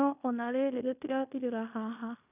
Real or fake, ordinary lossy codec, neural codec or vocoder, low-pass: fake; none; codec, 16 kHz in and 24 kHz out, 0.9 kbps, LongCat-Audio-Codec, fine tuned four codebook decoder; 3.6 kHz